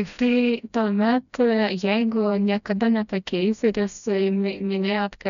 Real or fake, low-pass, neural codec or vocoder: fake; 7.2 kHz; codec, 16 kHz, 1 kbps, FreqCodec, smaller model